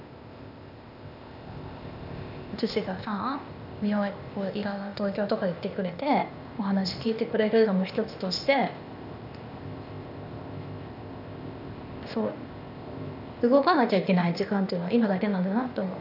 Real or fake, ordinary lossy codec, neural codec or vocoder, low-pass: fake; none; codec, 16 kHz, 0.8 kbps, ZipCodec; 5.4 kHz